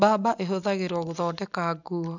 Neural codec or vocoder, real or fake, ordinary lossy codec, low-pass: none; real; none; 7.2 kHz